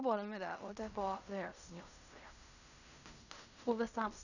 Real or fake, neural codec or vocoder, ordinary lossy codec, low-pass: fake; codec, 16 kHz in and 24 kHz out, 0.4 kbps, LongCat-Audio-Codec, fine tuned four codebook decoder; none; 7.2 kHz